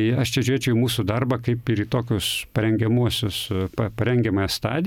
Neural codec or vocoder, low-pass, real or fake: none; 19.8 kHz; real